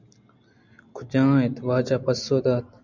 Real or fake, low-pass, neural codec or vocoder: real; 7.2 kHz; none